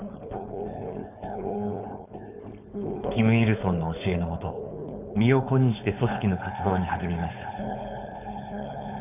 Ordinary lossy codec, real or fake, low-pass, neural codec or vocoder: none; fake; 3.6 kHz; codec, 16 kHz, 4.8 kbps, FACodec